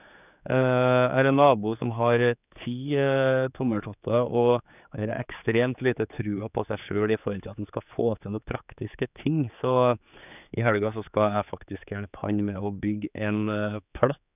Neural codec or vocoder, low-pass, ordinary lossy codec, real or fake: codec, 16 kHz, 4 kbps, X-Codec, HuBERT features, trained on general audio; 3.6 kHz; none; fake